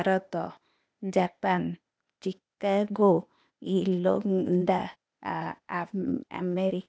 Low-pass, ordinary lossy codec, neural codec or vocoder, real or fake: none; none; codec, 16 kHz, 0.8 kbps, ZipCodec; fake